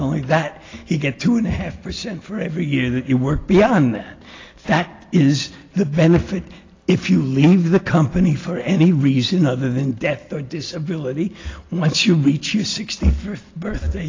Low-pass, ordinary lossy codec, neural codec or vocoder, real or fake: 7.2 kHz; AAC, 32 kbps; none; real